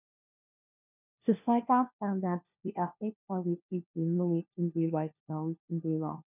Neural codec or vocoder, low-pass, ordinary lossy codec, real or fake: codec, 16 kHz, 1 kbps, FunCodec, trained on LibriTTS, 50 frames a second; 3.6 kHz; none; fake